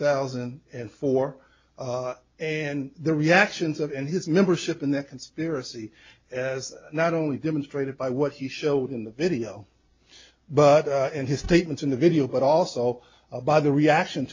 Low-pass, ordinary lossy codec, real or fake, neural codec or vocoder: 7.2 kHz; MP3, 48 kbps; real; none